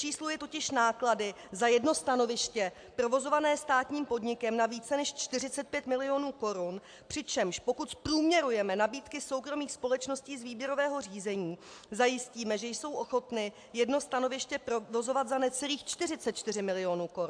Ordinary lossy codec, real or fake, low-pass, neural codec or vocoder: AAC, 64 kbps; real; 9.9 kHz; none